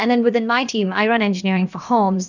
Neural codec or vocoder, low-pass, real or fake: codec, 16 kHz, about 1 kbps, DyCAST, with the encoder's durations; 7.2 kHz; fake